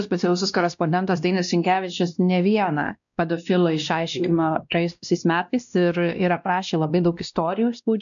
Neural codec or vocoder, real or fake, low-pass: codec, 16 kHz, 1 kbps, X-Codec, WavLM features, trained on Multilingual LibriSpeech; fake; 7.2 kHz